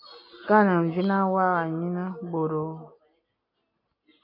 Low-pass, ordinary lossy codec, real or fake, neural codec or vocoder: 5.4 kHz; MP3, 32 kbps; real; none